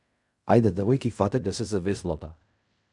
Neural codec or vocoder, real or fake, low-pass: codec, 16 kHz in and 24 kHz out, 0.4 kbps, LongCat-Audio-Codec, fine tuned four codebook decoder; fake; 10.8 kHz